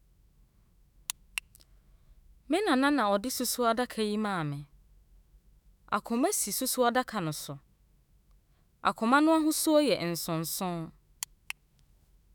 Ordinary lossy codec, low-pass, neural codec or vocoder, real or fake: none; none; autoencoder, 48 kHz, 128 numbers a frame, DAC-VAE, trained on Japanese speech; fake